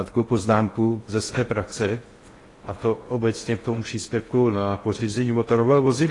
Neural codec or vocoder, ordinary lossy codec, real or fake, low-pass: codec, 16 kHz in and 24 kHz out, 0.6 kbps, FocalCodec, streaming, 4096 codes; AAC, 32 kbps; fake; 10.8 kHz